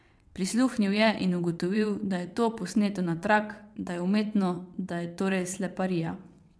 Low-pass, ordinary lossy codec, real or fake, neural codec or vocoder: none; none; fake; vocoder, 22.05 kHz, 80 mel bands, WaveNeXt